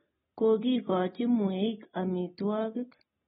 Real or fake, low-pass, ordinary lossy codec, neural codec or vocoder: real; 19.8 kHz; AAC, 16 kbps; none